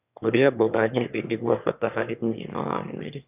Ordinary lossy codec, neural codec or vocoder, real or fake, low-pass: none; autoencoder, 22.05 kHz, a latent of 192 numbers a frame, VITS, trained on one speaker; fake; 3.6 kHz